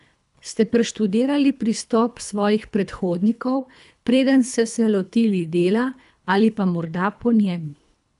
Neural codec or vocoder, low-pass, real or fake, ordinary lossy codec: codec, 24 kHz, 3 kbps, HILCodec; 10.8 kHz; fake; none